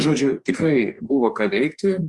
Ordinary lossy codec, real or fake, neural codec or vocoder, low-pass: Opus, 64 kbps; fake; codec, 44.1 kHz, 2.6 kbps, DAC; 10.8 kHz